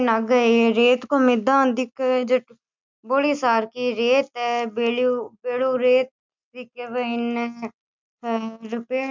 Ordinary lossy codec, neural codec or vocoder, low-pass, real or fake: MP3, 64 kbps; none; 7.2 kHz; real